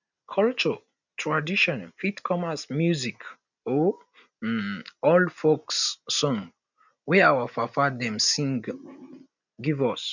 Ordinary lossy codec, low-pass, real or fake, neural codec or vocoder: none; 7.2 kHz; real; none